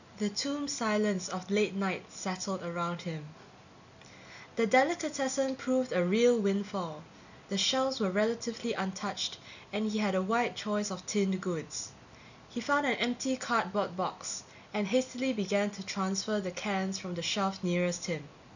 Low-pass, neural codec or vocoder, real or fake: 7.2 kHz; none; real